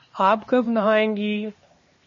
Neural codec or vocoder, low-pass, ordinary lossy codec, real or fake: codec, 16 kHz, 2 kbps, X-Codec, HuBERT features, trained on LibriSpeech; 7.2 kHz; MP3, 32 kbps; fake